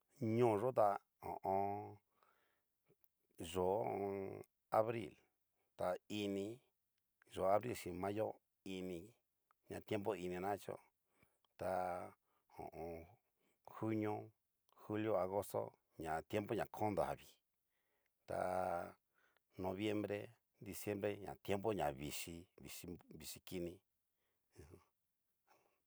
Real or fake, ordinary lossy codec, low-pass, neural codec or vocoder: real; none; none; none